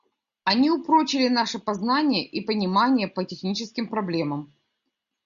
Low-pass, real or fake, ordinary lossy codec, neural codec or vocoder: 7.2 kHz; real; Opus, 64 kbps; none